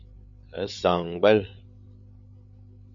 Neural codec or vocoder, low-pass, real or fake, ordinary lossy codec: codec, 16 kHz, 8 kbps, FreqCodec, larger model; 7.2 kHz; fake; MP3, 64 kbps